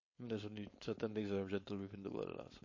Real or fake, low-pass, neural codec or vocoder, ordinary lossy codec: fake; 7.2 kHz; codec, 16 kHz, 4.8 kbps, FACodec; MP3, 32 kbps